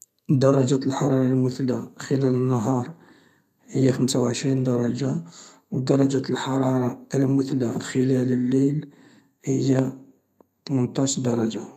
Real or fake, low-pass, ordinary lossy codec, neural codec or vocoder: fake; 14.4 kHz; none; codec, 32 kHz, 1.9 kbps, SNAC